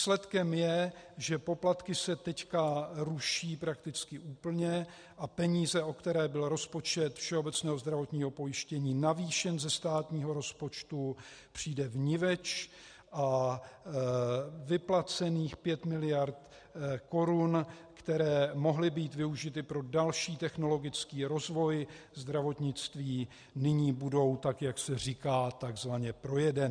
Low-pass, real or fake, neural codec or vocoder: 9.9 kHz; real; none